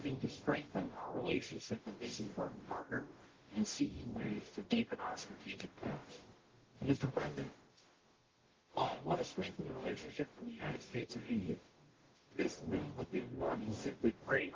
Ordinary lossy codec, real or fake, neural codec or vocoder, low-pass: Opus, 16 kbps; fake; codec, 44.1 kHz, 0.9 kbps, DAC; 7.2 kHz